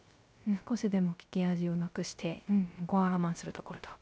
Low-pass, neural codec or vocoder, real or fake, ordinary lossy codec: none; codec, 16 kHz, 0.3 kbps, FocalCodec; fake; none